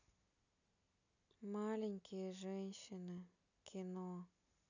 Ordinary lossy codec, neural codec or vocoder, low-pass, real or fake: none; none; 7.2 kHz; real